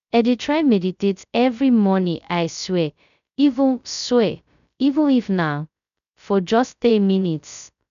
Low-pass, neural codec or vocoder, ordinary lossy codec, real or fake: 7.2 kHz; codec, 16 kHz, 0.2 kbps, FocalCodec; none; fake